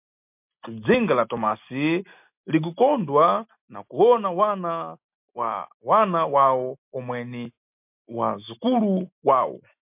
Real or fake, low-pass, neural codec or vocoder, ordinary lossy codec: real; 3.6 kHz; none; MP3, 32 kbps